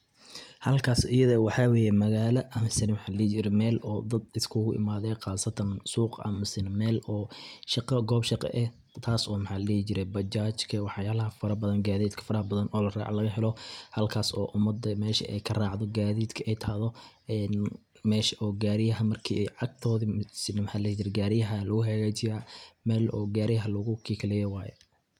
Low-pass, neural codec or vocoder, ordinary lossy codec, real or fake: 19.8 kHz; none; none; real